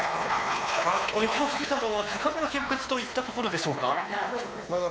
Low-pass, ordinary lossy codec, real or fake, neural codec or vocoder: none; none; fake; codec, 16 kHz, 2 kbps, X-Codec, WavLM features, trained on Multilingual LibriSpeech